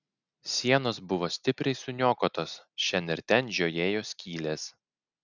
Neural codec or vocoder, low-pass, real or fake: none; 7.2 kHz; real